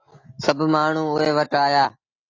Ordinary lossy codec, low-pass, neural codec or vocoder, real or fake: AAC, 32 kbps; 7.2 kHz; none; real